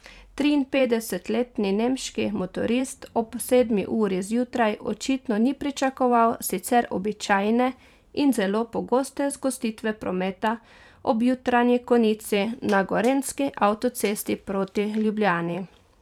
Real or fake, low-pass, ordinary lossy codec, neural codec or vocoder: fake; 19.8 kHz; none; vocoder, 44.1 kHz, 128 mel bands every 512 samples, BigVGAN v2